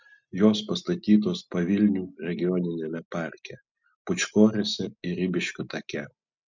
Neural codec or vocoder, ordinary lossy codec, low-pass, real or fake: none; MP3, 64 kbps; 7.2 kHz; real